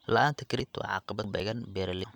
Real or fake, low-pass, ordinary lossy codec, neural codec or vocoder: fake; 19.8 kHz; none; vocoder, 44.1 kHz, 128 mel bands every 512 samples, BigVGAN v2